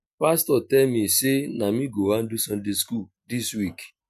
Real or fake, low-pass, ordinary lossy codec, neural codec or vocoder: real; 14.4 kHz; MP3, 96 kbps; none